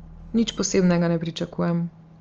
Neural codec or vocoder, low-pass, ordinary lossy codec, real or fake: none; 7.2 kHz; Opus, 24 kbps; real